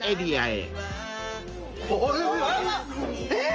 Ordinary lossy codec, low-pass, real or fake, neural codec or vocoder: Opus, 24 kbps; 7.2 kHz; real; none